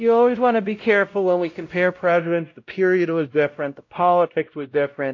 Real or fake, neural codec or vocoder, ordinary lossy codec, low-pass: fake; codec, 16 kHz, 0.5 kbps, X-Codec, WavLM features, trained on Multilingual LibriSpeech; AAC, 48 kbps; 7.2 kHz